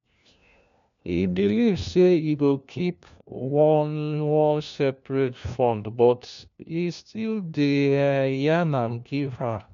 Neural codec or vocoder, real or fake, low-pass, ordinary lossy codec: codec, 16 kHz, 1 kbps, FunCodec, trained on LibriTTS, 50 frames a second; fake; 7.2 kHz; MP3, 64 kbps